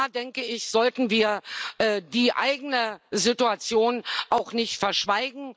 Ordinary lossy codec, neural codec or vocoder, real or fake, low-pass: none; none; real; none